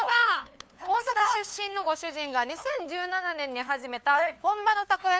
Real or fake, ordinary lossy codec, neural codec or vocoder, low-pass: fake; none; codec, 16 kHz, 2 kbps, FunCodec, trained on LibriTTS, 25 frames a second; none